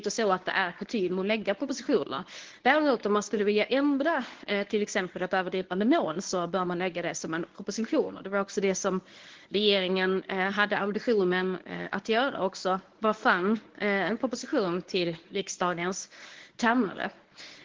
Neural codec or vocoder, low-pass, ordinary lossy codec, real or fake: codec, 24 kHz, 0.9 kbps, WavTokenizer, medium speech release version 1; 7.2 kHz; Opus, 16 kbps; fake